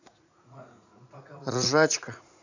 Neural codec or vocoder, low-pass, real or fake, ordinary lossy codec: vocoder, 44.1 kHz, 80 mel bands, Vocos; 7.2 kHz; fake; none